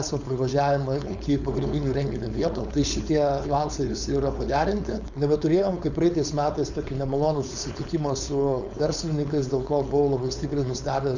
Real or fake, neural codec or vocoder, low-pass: fake; codec, 16 kHz, 4.8 kbps, FACodec; 7.2 kHz